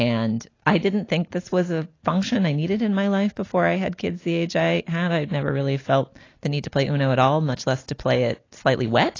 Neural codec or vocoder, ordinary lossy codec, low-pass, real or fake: none; AAC, 32 kbps; 7.2 kHz; real